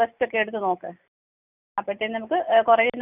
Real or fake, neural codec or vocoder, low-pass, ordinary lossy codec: real; none; 3.6 kHz; none